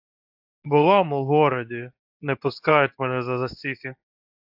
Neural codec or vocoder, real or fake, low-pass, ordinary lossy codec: codec, 24 kHz, 0.9 kbps, WavTokenizer, medium speech release version 2; fake; 5.4 kHz; AAC, 48 kbps